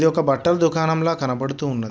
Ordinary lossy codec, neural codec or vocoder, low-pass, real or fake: none; none; none; real